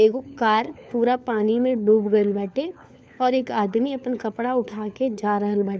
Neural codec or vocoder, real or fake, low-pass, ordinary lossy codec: codec, 16 kHz, 4 kbps, FunCodec, trained on LibriTTS, 50 frames a second; fake; none; none